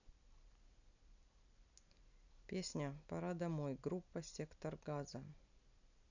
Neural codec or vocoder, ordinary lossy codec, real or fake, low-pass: none; none; real; 7.2 kHz